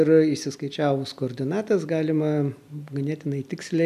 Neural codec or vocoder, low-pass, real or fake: none; 14.4 kHz; real